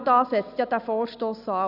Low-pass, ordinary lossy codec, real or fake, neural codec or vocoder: 5.4 kHz; none; real; none